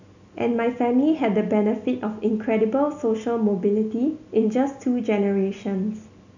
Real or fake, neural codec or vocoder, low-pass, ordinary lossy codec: real; none; 7.2 kHz; none